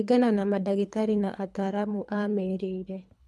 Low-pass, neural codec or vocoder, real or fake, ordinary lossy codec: none; codec, 24 kHz, 3 kbps, HILCodec; fake; none